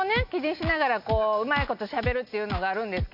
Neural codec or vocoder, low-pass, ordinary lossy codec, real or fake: none; 5.4 kHz; none; real